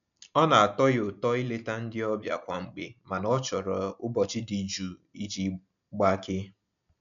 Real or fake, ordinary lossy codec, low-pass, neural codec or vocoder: real; none; 7.2 kHz; none